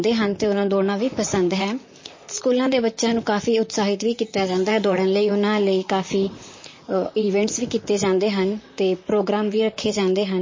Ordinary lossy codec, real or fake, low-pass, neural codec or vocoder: MP3, 32 kbps; fake; 7.2 kHz; vocoder, 44.1 kHz, 128 mel bands, Pupu-Vocoder